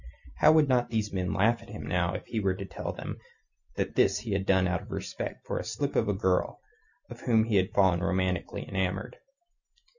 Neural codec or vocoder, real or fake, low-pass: none; real; 7.2 kHz